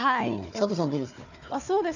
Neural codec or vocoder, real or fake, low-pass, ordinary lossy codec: codec, 16 kHz, 4 kbps, FunCodec, trained on Chinese and English, 50 frames a second; fake; 7.2 kHz; none